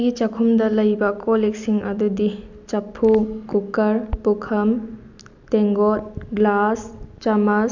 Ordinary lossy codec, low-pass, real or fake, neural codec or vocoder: none; 7.2 kHz; real; none